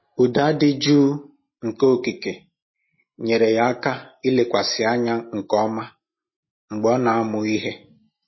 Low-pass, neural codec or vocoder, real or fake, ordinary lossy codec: 7.2 kHz; none; real; MP3, 24 kbps